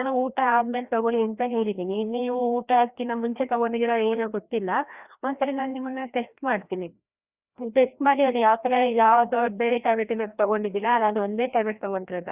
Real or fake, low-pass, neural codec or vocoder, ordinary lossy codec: fake; 3.6 kHz; codec, 16 kHz, 1 kbps, FreqCodec, larger model; Opus, 64 kbps